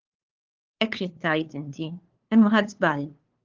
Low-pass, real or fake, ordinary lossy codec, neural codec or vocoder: 7.2 kHz; fake; Opus, 16 kbps; codec, 16 kHz, 2 kbps, FunCodec, trained on LibriTTS, 25 frames a second